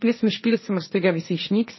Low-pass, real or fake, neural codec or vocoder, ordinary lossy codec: 7.2 kHz; fake; codec, 16 kHz, 1.1 kbps, Voila-Tokenizer; MP3, 24 kbps